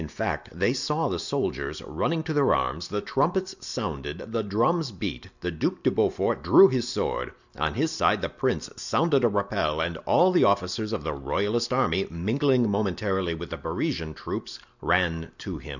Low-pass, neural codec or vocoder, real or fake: 7.2 kHz; none; real